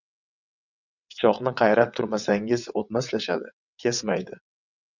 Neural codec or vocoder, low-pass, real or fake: vocoder, 22.05 kHz, 80 mel bands, WaveNeXt; 7.2 kHz; fake